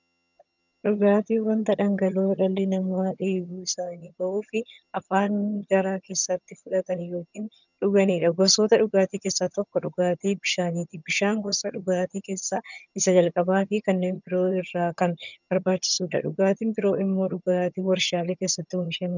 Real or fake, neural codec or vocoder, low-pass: fake; vocoder, 22.05 kHz, 80 mel bands, HiFi-GAN; 7.2 kHz